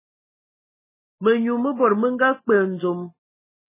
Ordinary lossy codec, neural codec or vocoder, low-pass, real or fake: MP3, 16 kbps; none; 3.6 kHz; real